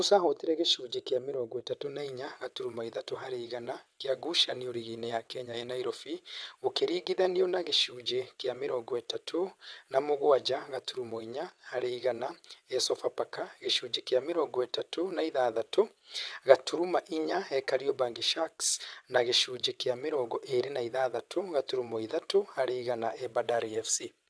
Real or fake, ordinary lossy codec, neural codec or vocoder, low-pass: fake; none; vocoder, 22.05 kHz, 80 mel bands, WaveNeXt; none